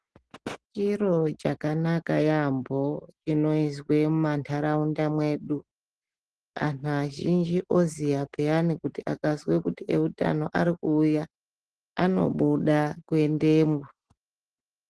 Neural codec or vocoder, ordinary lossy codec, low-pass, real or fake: none; Opus, 16 kbps; 10.8 kHz; real